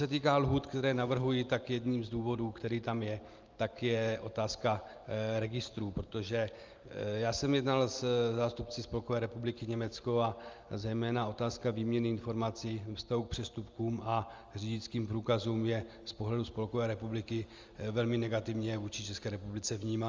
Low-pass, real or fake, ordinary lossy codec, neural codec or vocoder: 7.2 kHz; real; Opus, 32 kbps; none